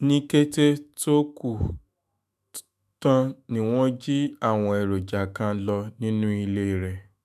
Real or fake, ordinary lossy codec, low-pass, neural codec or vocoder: fake; none; 14.4 kHz; autoencoder, 48 kHz, 128 numbers a frame, DAC-VAE, trained on Japanese speech